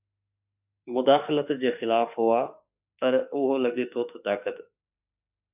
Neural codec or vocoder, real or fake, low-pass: autoencoder, 48 kHz, 32 numbers a frame, DAC-VAE, trained on Japanese speech; fake; 3.6 kHz